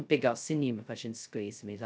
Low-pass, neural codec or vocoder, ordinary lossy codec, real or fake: none; codec, 16 kHz, 0.2 kbps, FocalCodec; none; fake